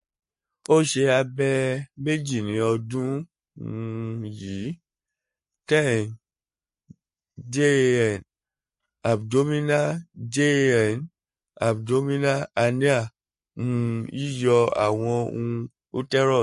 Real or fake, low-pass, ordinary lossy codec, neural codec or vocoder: fake; 14.4 kHz; MP3, 48 kbps; codec, 44.1 kHz, 7.8 kbps, Pupu-Codec